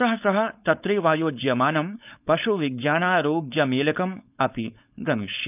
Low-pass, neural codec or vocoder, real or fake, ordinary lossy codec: 3.6 kHz; codec, 16 kHz, 4.8 kbps, FACodec; fake; none